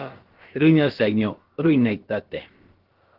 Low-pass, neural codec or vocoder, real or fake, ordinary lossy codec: 5.4 kHz; codec, 16 kHz, about 1 kbps, DyCAST, with the encoder's durations; fake; Opus, 16 kbps